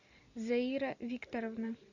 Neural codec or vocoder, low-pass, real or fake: vocoder, 44.1 kHz, 128 mel bands every 256 samples, BigVGAN v2; 7.2 kHz; fake